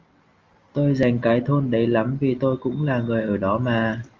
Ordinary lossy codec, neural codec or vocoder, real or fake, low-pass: Opus, 32 kbps; none; real; 7.2 kHz